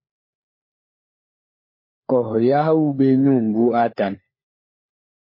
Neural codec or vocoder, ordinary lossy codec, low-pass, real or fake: codec, 16 kHz, 4 kbps, FunCodec, trained on LibriTTS, 50 frames a second; MP3, 24 kbps; 5.4 kHz; fake